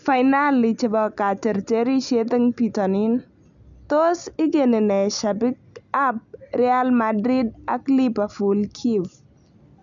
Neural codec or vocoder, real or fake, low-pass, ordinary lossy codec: none; real; 7.2 kHz; none